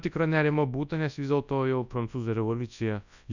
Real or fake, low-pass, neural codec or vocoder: fake; 7.2 kHz; codec, 24 kHz, 0.9 kbps, WavTokenizer, large speech release